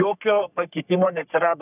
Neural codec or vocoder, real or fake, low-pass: codec, 44.1 kHz, 3.4 kbps, Pupu-Codec; fake; 3.6 kHz